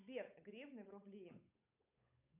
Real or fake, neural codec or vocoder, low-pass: fake; codec, 16 kHz, 8 kbps, FunCodec, trained on Chinese and English, 25 frames a second; 3.6 kHz